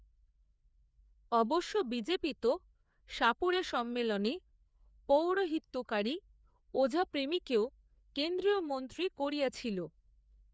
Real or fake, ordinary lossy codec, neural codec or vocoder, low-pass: fake; none; codec, 16 kHz, 4 kbps, FreqCodec, larger model; none